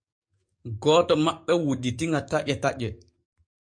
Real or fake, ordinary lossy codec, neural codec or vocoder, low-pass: fake; MP3, 48 kbps; codec, 44.1 kHz, 7.8 kbps, DAC; 9.9 kHz